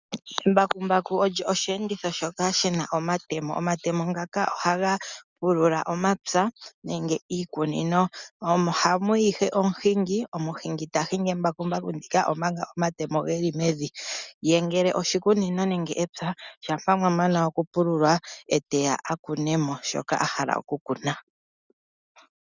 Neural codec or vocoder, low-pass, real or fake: none; 7.2 kHz; real